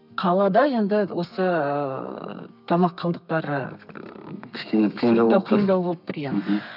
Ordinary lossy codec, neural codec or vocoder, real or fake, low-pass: none; codec, 32 kHz, 1.9 kbps, SNAC; fake; 5.4 kHz